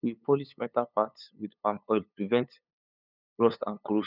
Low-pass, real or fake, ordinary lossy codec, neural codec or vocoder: 5.4 kHz; fake; none; codec, 16 kHz, 8 kbps, FunCodec, trained on LibriTTS, 25 frames a second